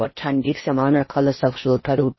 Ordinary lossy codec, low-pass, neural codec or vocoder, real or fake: MP3, 24 kbps; 7.2 kHz; codec, 16 kHz in and 24 kHz out, 0.6 kbps, FocalCodec, streaming, 4096 codes; fake